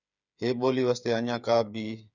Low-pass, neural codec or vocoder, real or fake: 7.2 kHz; codec, 16 kHz, 8 kbps, FreqCodec, smaller model; fake